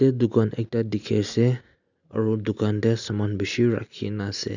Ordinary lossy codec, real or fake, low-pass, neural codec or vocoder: none; real; 7.2 kHz; none